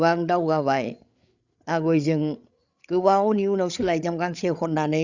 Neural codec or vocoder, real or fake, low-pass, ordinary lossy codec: codec, 16 kHz, 8 kbps, FreqCodec, larger model; fake; 7.2 kHz; Opus, 64 kbps